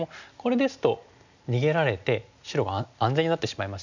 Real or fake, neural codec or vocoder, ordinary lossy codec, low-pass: real; none; none; 7.2 kHz